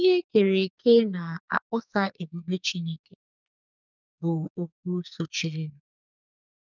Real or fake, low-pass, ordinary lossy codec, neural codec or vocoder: fake; 7.2 kHz; none; codec, 44.1 kHz, 2.6 kbps, SNAC